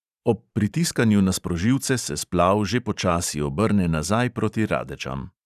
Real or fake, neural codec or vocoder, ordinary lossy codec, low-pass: fake; vocoder, 44.1 kHz, 128 mel bands every 512 samples, BigVGAN v2; none; 14.4 kHz